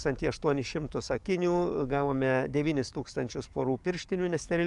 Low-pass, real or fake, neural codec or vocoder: 10.8 kHz; fake; codec, 44.1 kHz, 7.8 kbps, DAC